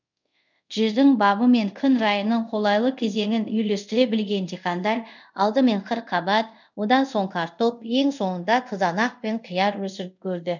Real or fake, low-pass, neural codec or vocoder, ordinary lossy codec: fake; 7.2 kHz; codec, 24 kHz, 0.5 kbps, DualCodec; none